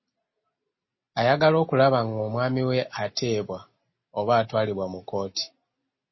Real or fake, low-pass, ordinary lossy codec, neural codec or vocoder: real; 7.2 kHz; MP3, 24 kbps; none